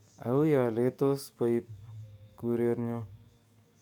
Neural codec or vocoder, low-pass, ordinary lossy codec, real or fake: codec, 44.1 kHz, 7.8 kbps, DAC; 19.8 kHz; MP3, 96 kbps; fake